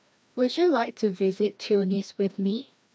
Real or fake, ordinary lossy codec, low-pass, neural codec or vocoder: fake; none; none; codec, 16 kHz, 1 kbps, FreqCodec, larger model